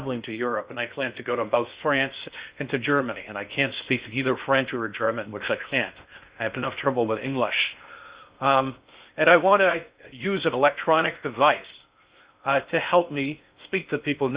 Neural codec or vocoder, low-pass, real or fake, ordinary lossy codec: codec, 16 kHz in and 24 kHz out, 0.6 kbps, FocalCodec, streaming, 2048 codes; 3.6 kHz; fake; Opus, 64 kbps